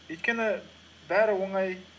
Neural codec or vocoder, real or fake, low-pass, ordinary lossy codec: none; real; none; none